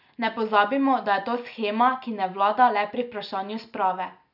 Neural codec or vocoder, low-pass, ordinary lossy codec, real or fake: none; 5.4 kHz; none; real